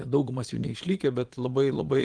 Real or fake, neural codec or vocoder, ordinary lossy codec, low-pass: fake; vocoder, 22.05 kHz, 80 mel bands, Vocos; Opus, 24 kbps; 9.9 kHz